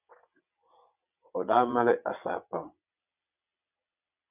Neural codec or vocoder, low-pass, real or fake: vocoder, 22.05 kHz, 80 mel bands, WaveNeXt; 3.6 kHz; fake